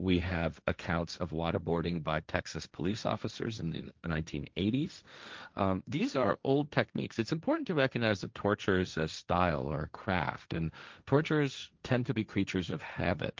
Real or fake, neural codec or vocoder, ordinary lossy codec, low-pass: fake; codec, 16 kHz, 1.1 kbps, Voila-Tokenizer; Opus, 24 kbps; 7.2 kHz